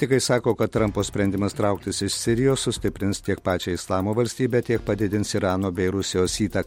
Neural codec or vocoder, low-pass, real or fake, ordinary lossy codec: none; 19.8 kHz; real; MP3, 64 kbps